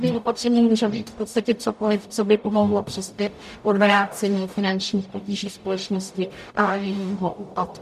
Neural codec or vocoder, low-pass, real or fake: codec, 44.1 kHz, 0.9 kbps, DAC; 14.4 kHz; fake